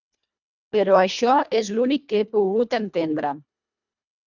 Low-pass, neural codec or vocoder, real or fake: 7.2 kHz; codec, 24 kHz, 1.5 kbps, HILCodec; fake